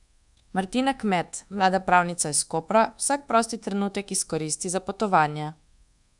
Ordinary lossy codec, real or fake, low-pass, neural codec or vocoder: none; fake; 10.8 kHz; codec, 24 kHz, 1.2 kbps, DualCodec